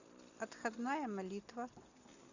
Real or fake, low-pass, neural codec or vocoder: real; 7.2 kHz; none